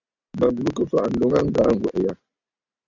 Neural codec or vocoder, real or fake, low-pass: none; real; 7.2 kHz